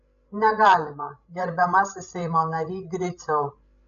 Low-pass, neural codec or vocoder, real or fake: 7.2 kHz; codec, 16 kHz, 16 kbps, FreqCodec, larger model; fake